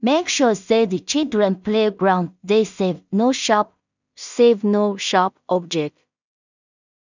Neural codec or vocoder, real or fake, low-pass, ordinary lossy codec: codec, 16 kHz in and 24 kHz out, 0.4 kbps, LongCat-Audio-Codec, two codebook decoder; fake; 7.2 kHz; none